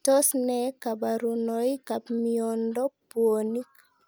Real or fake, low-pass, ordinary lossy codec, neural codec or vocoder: real; none; none; none